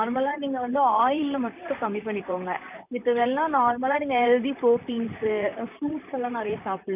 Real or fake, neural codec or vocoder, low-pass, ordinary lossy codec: fake; vocoder, 44.1 kHz, 128 mel bands, Pupu-Vocoder; 3.6 kHz; none